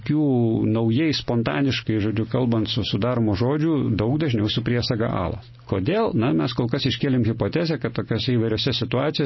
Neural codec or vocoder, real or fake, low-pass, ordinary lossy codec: none; real; 7.2 kHz; MP3, 24 kbps